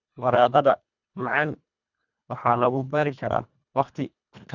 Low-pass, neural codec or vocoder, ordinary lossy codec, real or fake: 7.2 kHz; codec, 24 kHz, 1.5 kbps, HILCodec; none; fake